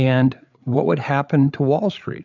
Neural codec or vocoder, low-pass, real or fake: codec, 16 kHz, 8 kbps, FreqCodec, larger model; 7.2 kHz; fake